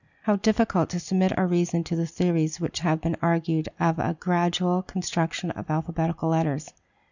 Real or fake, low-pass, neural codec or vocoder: real; 7.2 kHz; none